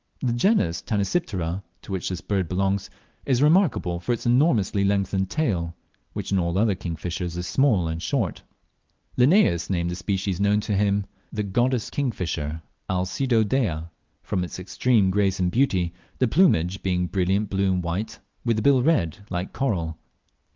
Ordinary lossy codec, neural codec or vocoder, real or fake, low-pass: Opus, 24 kbps; none; real; 7.2 kHz